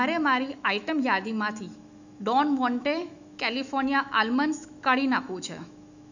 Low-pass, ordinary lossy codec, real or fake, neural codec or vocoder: 7.2 kHz; none; fake; autoencoder, 48 kHz, 128 numbers a frame, DAC-VAE, trained on Japanese speech